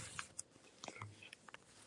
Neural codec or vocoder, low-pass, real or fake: none; 10.8 kHz; real